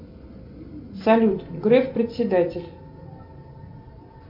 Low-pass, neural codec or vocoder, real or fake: 5.4 kHz; none; real